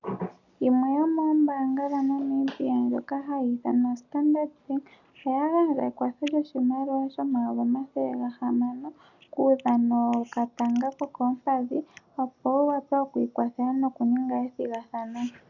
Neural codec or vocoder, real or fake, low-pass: none; real; 7.2 kHz